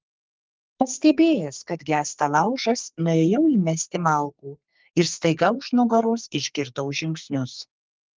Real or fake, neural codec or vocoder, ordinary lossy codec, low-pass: fake; codec, 44.1 kHz, 2.6 kbps, SNAC; Opus, 32 kbps; 7.2 kHz